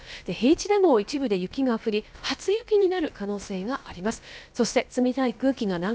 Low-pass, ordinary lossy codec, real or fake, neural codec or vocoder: none; none; fake; codec, 16 kHz, about 1 kbps, DyCAST, with the encoder's durations